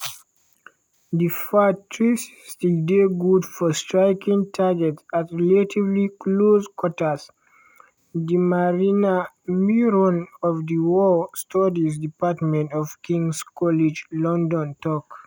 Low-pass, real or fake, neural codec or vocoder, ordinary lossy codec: none; real; none; none